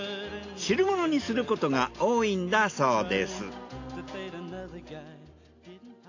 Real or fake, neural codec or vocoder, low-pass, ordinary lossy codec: real; none; 7.2 kHz; AAC, 48 kbps